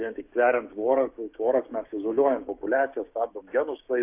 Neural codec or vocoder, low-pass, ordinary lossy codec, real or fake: codec, 16 kHz, 6 kbps, DAC; 3.6 kHz; AAC, 32 kbps; fake